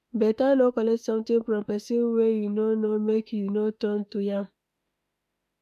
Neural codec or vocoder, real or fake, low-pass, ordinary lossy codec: autoencoder, 48 kHz, 32 numbers a frame, DAC-VAE, trained on Japanese speech; fake; 14.4 kHz; AAC, 96 kbps